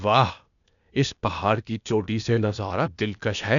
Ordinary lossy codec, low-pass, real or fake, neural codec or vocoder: none; 7.2 kHz; fake; codec, 16 kHz, 0.8 kbps, ZipCodec